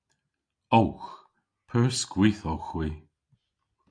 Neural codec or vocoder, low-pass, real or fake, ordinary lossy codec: none; 9.9 kHz; real; AAC, 48 kbps